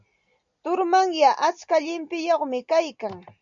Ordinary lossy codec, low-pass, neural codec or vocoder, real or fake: MP3, 96 kbps; 7.2 kHz; none; real